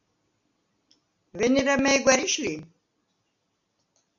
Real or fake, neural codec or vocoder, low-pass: real; none; 7.2 kHz